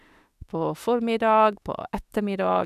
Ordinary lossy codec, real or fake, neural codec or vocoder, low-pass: none; fake; autoencoder, 48 kHz, 32 numbers a frame, DAC-VAE, trained on Japanese speech; 14.4 kHz